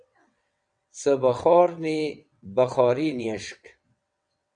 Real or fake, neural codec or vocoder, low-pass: fake; vocoder, 22.05 kHz, 80 mel bands, WaveNeXt; 9.9 kHz